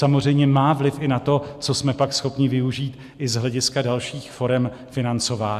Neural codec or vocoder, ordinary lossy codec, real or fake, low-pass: none; MP3, 96 kbps; real; 14.4 kHz